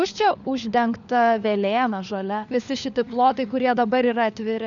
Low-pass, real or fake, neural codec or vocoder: 7.2 kHz; fake; codec, 16 kHz, 4 kbps, FunCodec, trained on LibriTTS, 50 frames a second